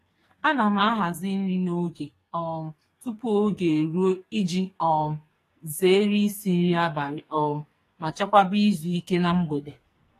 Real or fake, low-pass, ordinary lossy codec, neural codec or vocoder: fake; 14.4 kHz; AAC, 48 kbps; codec, 44.1 kHz, 2.6 kbps, SNAC